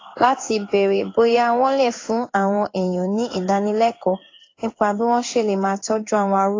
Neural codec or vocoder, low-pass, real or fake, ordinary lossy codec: codec, 16 kHz in and 24 kHz out, 1 kbps, XY-Tokenizer; 7.2 kHz; fake; AAC, 32 kbps